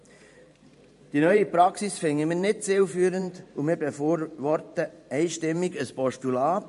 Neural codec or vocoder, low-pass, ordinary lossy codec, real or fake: vocoder, 44.1 kHz, 128 mel bands every 512 samples, BigVGAN v2; 14.4 kHz; MP3, 48 kbps; fake